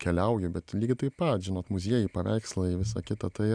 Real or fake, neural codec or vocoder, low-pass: real; none; 9.9 kHz